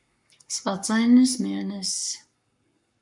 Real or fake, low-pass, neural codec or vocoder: fake; 10.8 kHz; codec, 44.1 kHz, 7.8 kbps, Pupu-Codec